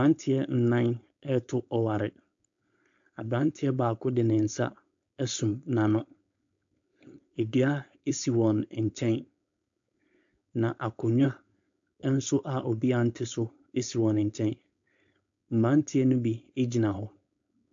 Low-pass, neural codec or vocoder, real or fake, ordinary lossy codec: 7.2 kHz; codec, 16 kHz, 4.8 kbps, FACodec; fake; AAC, 64 kbps